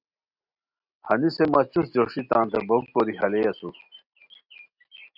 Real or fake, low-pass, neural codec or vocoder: real; 5.4 kHz; none